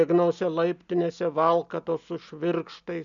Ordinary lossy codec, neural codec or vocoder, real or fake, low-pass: MP3, 96 kbps; none; real; 7.2 kHz